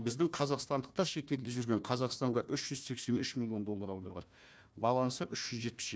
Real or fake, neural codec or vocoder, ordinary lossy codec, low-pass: fake; codec, 16 kHz, 1 kbps, FunCodec, trained on Chinese and English, 50 frames a second; none; none